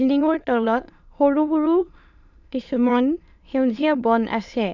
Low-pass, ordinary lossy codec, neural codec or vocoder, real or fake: 7.2 kHz; none; autoencoder, 22.05 kHz, a latent of 192 numbers a frame, VITS, trained on many speakers; fake